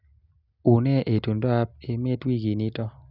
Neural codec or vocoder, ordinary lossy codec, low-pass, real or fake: none; none; 5.4 kHz; real